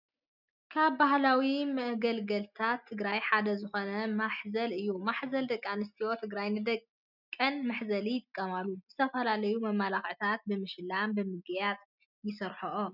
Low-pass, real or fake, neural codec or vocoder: 5.4 kHz; real; none